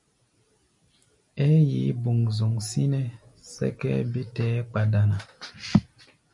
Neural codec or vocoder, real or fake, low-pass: none; real; 10.8 kHz